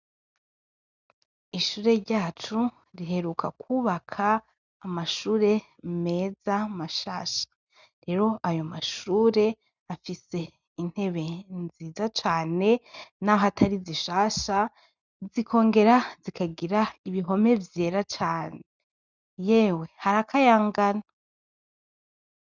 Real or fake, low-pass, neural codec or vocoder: real; 7.2 kHz; none